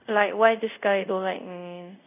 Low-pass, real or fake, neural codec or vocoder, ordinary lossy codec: 3.6 kHz; fake; codec, 24 kHz, 0.5 kbps, DualCodec; none